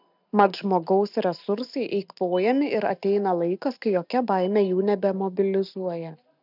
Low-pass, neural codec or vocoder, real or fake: 5.4 kHz; none; real